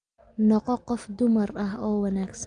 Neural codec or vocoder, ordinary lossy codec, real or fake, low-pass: none; Opus, 32 kbps; real; 9.9 kHz